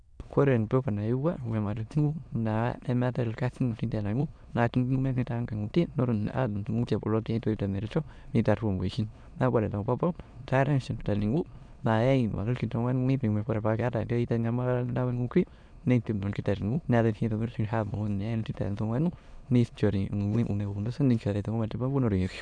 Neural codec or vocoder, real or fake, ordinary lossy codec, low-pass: autoencoder, 22.05 kHz, a latent of 192 numbers a frame, VITS, trained on many speakers; fake; none; 9.9 kHz